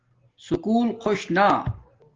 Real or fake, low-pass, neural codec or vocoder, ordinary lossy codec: real; 7.2 kHz; none; Opus, 16 kbps